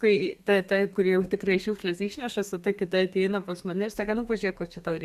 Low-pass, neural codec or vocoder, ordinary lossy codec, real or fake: 14.4 kHz; codec, 32 kHz, 1.9 kbps, SNAC; Opus, 64 kbps; fake